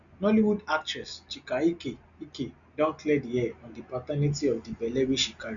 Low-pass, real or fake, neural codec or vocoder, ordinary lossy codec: 7.2 kHz; real; none; none